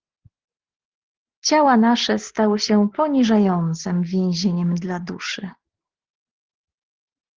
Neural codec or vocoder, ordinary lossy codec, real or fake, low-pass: none; Opus, 16 kbps; real; 7.2 kHz